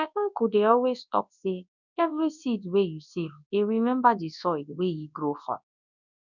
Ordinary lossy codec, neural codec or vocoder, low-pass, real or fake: none; codec, 24 kHz, 0.9 kbps, WavTokenizer, large speech release; 7.2 kHz; fake